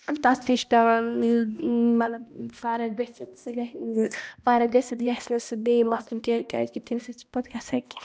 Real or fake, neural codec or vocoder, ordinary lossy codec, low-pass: fake; codec, 16 kHz, 1 kbps, X-Codec, HuBERT features, trained on balanced general audio; none; none